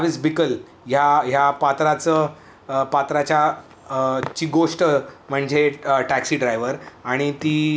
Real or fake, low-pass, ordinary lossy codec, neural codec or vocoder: real; none; none; none